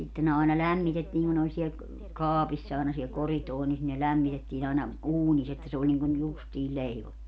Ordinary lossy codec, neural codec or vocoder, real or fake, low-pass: none; none; real; none